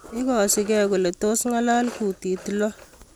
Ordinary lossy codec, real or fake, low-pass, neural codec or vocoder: none; real; none; none